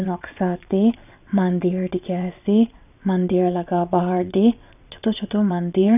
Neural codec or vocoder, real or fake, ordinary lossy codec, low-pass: vocoder, 22.05 kHz, 80 mel bands, WaveNeXt; fake; none; 3.6 kHz